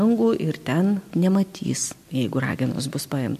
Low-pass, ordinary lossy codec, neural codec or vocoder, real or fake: 14.4 kHz; MP3, 64 kbps; none; real